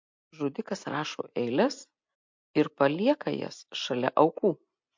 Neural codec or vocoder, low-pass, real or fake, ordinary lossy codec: none; 7.2 kHz; real; MP3, 48 kbps